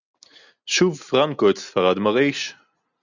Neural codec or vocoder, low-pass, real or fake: none; 7.2 kHz; real